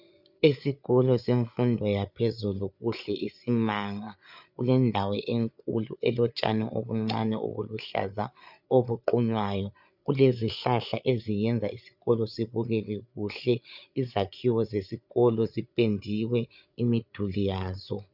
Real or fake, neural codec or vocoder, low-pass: fake; codec, 16 kHz, 8 kbps, FreqCodec, larger model; 5.4 kHz